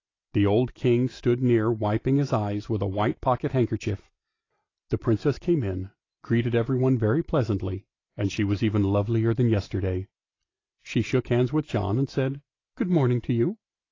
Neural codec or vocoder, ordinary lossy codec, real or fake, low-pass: none; AAC, 32 kbps; real; 7.2 kHz